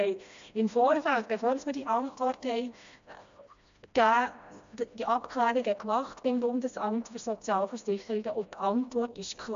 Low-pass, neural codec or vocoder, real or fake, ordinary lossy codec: 7.2 kHz; codec, 16 kHz, 1 kbps, FreqCodec, smaller model; fake; AAC, 64 kbps